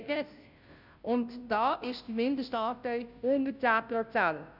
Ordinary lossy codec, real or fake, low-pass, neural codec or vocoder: none; fake; 5.4 kHz; codec, 16 kHz, 0.5 kbps, FunCodec, trained on Chinese and English, 25 frames a second